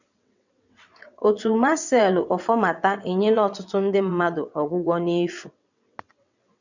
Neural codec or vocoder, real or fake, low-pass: vocoder, 22.05 kHz, 80 mel bands, WaveNeXt; fake; 7.2 kHz